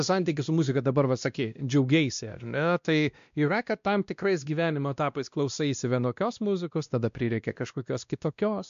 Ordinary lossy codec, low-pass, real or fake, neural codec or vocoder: MP3, 64 kbps; 7.2 kHz; fake; codec, 16 kHz, 1 kbps, X-Codec, WavLM features, trained on Multilingual LibriSpeech